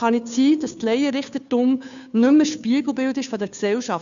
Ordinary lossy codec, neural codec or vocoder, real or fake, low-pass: none; codec, 16 kHz, 2 kbps, FunCodec, trained on Chinese and English, 25 frames a second; fake; 7.2 kHz